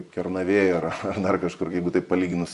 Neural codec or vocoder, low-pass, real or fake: vocoder, 24 kHz, 100 mel bands, Vocos; 10.8 kHz; fake